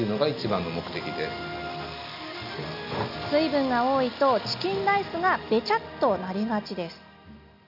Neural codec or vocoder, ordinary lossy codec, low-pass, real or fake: none; none; 5.4 kHz; real